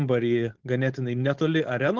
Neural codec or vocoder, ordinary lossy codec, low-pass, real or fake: codec, 16 kHz, 4.8 kbps, FACodec; Opus, 16 kbps; 7.2 kHz; fake